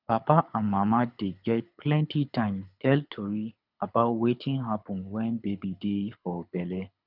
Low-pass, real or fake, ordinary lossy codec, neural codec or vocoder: 5.4 kHz; fake; none; codec, 24 kHz, 6 kbps, HILCodec